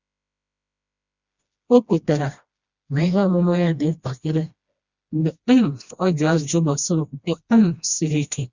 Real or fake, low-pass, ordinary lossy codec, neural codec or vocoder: fake; 7.2 kHz; Opus, 64 kbps; codec, 16 kHz, 1 kbps, FreqCodec, smaller model